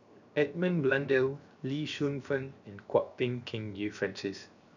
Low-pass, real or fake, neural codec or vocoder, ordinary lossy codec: 7.2 kHz; fake; codec, 16 kHz, 0.7 kbps, FocalCodec; none